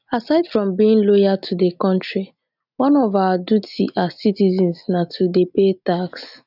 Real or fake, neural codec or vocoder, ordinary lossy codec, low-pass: real; none; none; 5.4 kHz